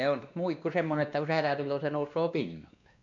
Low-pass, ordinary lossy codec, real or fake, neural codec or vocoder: 7.2 kHz; none; fake; codec, 16 kHz, 2 kbps, X-Codec, WavLM features, trained on Multilingual LibriSpeech